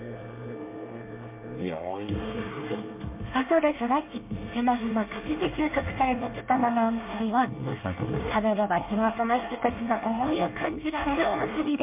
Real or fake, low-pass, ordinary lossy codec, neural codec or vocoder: fake; 3.6 kHz; MP3, 32 kbps; codec, 24 kHz, 1 kbps, SNAC